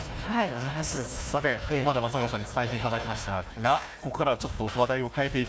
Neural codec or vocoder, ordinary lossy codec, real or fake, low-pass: codec, 16 kHz, 1 kbps, FunCodec, trained on Chinese and English, 50 frames a second; none; fake; none